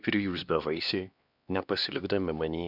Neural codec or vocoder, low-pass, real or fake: codec, 16 kHz, 2 kbps, X-Codec, HuBERT features, trained on LibriSpeech; 5.4 kHz; fake